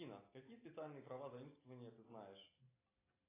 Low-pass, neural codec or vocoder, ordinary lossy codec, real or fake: 3.6 kHz; none; AAC, 16 kbps; real